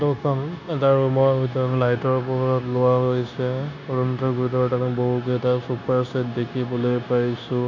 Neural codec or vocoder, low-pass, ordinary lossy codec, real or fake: codec, 16 kHz, 0.9 kbps, LongCat-Audio-Codec; 7.2 kHz; none; fake